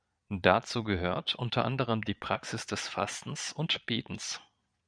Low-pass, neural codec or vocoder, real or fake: 9.9 kHz; vocoder, 22.05 kHz, 80 mel bands, Vocos; fake